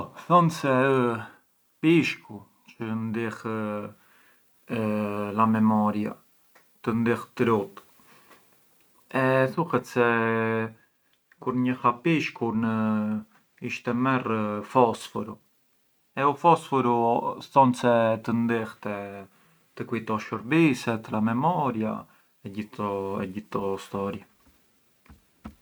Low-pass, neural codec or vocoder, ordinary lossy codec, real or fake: none; none; none; real